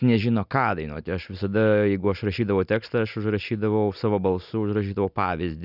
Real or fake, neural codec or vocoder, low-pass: real; none; 5.4 kHz